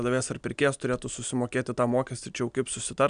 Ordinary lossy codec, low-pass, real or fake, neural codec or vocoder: MP3, 96 kbps; 9.9 kHz; real; none